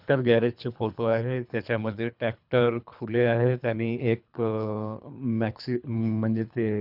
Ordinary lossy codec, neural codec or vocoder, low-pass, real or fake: none; codec, 24 kHz, 3 kbps, HILCodec; 5.4 kHz; fake